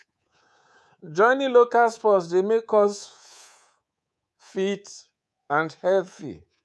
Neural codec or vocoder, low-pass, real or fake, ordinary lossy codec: codec, 24 kHz, 3.1 kbps, DualCodec; none; fake; none